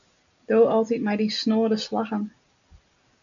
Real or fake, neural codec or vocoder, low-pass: real; none; 7.2 kHz